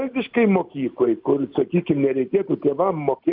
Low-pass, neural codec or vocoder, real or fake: 5.4 kHz; none; real